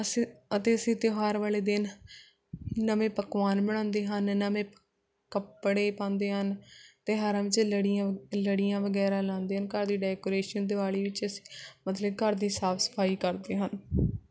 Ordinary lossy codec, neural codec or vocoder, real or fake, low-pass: none; none; real; none